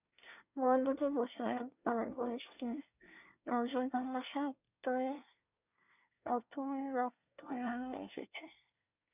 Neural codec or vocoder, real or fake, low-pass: codec, 24 kHz, 1 kbps, SNAC; fake; 3.6 kHz